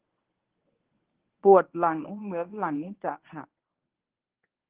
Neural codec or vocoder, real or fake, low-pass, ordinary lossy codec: codec, 24 kHz, 0.9 kbps, WavTokenizer, medium speech release version 1; fake; 3.6 kHz; Opus, 16 kbps